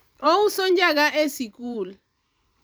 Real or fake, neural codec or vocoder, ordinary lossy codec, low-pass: fake; vocoder, 44.1 kHz, 128 mel bands every 512 samples, BigVGAN v2; none; none